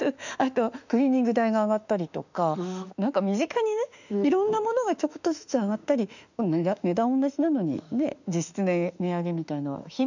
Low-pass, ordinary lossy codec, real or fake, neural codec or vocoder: 7.2 kHz; none; fake; autoencoder, 48 kHz, 32 numbers a frame, DAC-VAE, trained on Japanese speech